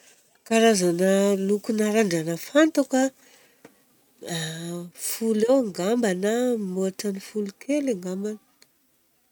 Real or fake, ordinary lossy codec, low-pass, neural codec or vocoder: real; none; none; none